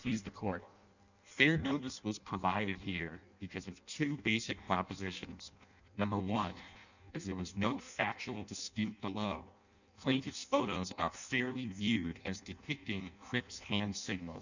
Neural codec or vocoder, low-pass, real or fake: codec, 16 kHz in and 24 kHz out, 0.6 kbps, FireRedTTS-2 codec; 7.2 kHz; fake